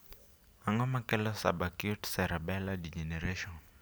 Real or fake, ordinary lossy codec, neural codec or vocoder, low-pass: real; none; none; none